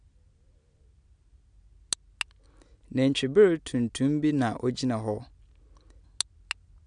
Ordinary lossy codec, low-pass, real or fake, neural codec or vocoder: none; 9.9 kHz; real; none